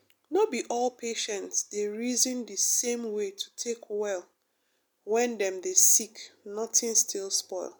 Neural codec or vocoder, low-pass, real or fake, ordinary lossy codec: none; none; real; none